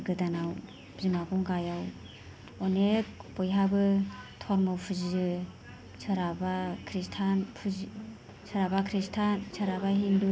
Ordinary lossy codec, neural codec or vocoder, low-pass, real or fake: none; none; none; real